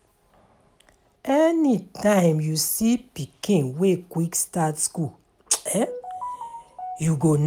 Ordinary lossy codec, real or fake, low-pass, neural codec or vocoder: none; real; 19.8 kHz; none